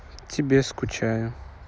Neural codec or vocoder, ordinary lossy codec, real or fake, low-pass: none; none; real; none